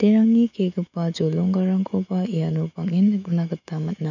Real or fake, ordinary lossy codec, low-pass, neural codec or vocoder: fake; none; 7.2 kHz; autoencoder, 48 kHz, 128 numbers a frame, DAC-VAE, trained on Japanese speech